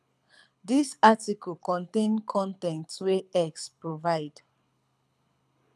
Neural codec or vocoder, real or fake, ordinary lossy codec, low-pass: codec, 24 kHz, 6 kbps, HILCodec; fake; none; none